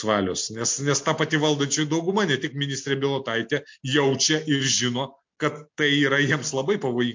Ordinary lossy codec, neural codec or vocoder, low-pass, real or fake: MP3, 48 kbps; none; 7.2 kHz; real